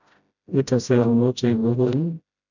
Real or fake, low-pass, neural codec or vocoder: fake; 7.2 kHz; codec, 16 kHz, 0.5 kbps, FreqCodec, smaller model